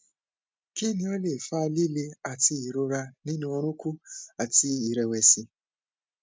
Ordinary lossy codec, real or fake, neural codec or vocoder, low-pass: none; real; none; none